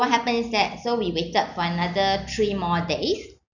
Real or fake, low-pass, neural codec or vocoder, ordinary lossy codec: real; 7.2 kHz; none; none